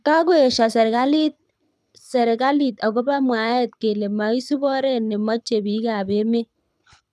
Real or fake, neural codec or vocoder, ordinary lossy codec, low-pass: fake; codec, 24 kHz, 6 kbps, HILCodec; none; none